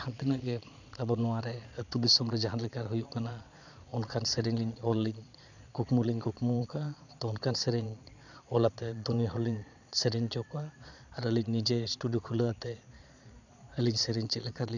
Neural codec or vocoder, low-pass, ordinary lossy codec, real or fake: vocoder, 22.05 kHz, 80 mel bands, Vocos; 7.2 kHz; none; fake